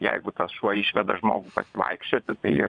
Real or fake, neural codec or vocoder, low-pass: fake; vocoder, 22.05 kHz, 80 mel bands, Vocos; 9.9 kHz